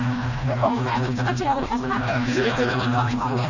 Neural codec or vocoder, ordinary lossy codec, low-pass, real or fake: codec, 16 kHz, 1 kbps, FreqCodec, smaller model; none; 7.2 kHz; fake